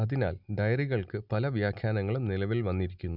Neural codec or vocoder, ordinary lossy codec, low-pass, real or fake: none; none; 5.4 kHz; real